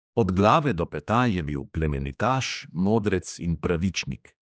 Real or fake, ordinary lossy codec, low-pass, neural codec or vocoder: fake; none; none; codec, 16 kHz, 2 kbps, X-Codec, HuBERT features, trained on general audio